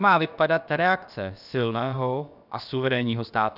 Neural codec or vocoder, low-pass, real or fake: codec, 16 kHz, about 1 kbps, DyCAST, with the encoder's durations; 5.4 kHz; fake